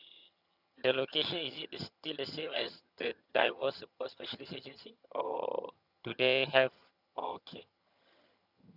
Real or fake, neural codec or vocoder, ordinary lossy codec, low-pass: fake; vocoder, 22.05 kHz, 80 mel bands, HiFi-GAN; none; 5.4 kHz